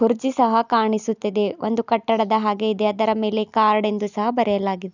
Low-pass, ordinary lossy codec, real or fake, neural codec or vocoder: 7.2 kHz; none; real; none